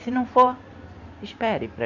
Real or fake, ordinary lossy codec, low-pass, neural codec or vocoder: fake; none; 7.2 kHz; vocoder, 44.1 kHz, 128 mel bands every 512 samples, BigVGAN v2